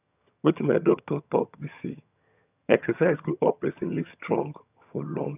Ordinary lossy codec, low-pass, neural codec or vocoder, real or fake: none; 3.6 kHz; vocoder, 22.05 kHz, 80 mel bands, HiFi-GAN; fake